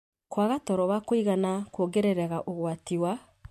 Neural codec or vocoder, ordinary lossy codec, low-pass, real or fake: none; MP3, 64 kbps; 14.4 kHz; real